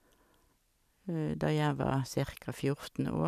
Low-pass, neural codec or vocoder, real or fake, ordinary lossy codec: 14.4 kHz; vocoder, 44.1 kHz, 128 mel bands every 256 samples, BigVGAN v2; fake; none